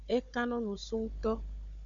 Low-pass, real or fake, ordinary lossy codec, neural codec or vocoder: 7.2 kHz; fake; MP3, 96 kbps; codec, 16 kHz, 16 kbps, FunCodec, trained on Chinese and English, 50 frames a second